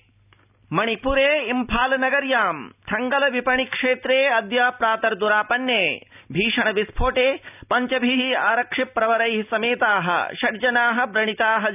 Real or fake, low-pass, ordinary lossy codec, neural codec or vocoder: real; 3.6 kHz; none; none